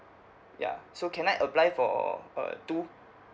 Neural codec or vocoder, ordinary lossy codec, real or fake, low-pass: none; none; real; none